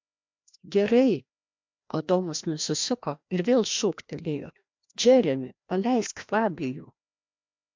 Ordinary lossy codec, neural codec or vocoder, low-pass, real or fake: MP3, 64 kbps; codec, 16 kHz, 1 kbps, FreqCodec, larger model; 7.2 kHz; fake